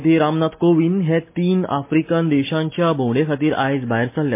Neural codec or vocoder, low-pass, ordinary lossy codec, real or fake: none; 3.6 kHz; MP3, 24 kbps; real